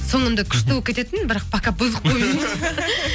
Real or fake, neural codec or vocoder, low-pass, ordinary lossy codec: real; none; none; none